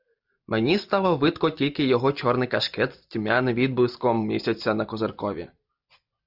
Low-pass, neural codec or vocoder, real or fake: 5.4 kHz; none; real